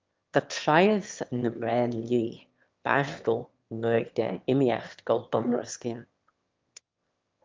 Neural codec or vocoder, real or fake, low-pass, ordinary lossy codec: autoencoder, 22.05 kHz, a latent of 192 numbers a frame, VITS, trained on one speaker; fake; 7.2 kHz; Opus, 32 kbps